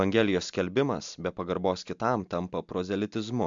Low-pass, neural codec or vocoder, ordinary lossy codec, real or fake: 7.2 kHz; none; MP3, 64 kbps; real